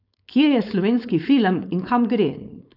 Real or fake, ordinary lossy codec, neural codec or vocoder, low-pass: fake; none; codec, 16 kHz, 4.8 kbps, FACodec; 5.4 kHz